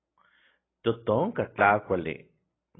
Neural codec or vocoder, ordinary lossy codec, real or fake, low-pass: none; AAC, 16 kbps; real; 7.2 kHz